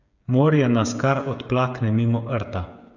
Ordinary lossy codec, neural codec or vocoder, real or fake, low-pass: none; codec, 16 kHz, 8 kbps, FreqCodec, smaller model; fake; 7.2 kHz